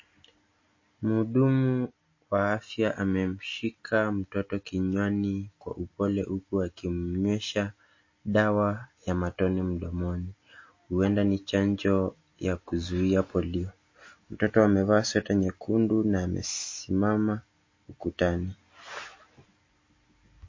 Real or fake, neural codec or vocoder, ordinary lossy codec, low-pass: real; none; MP3, 32 kbps; 7.2 kHz